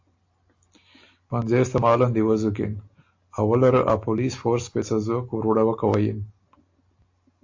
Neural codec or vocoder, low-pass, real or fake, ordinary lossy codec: none; 7.2 kHz; real; AAC, 48 kbps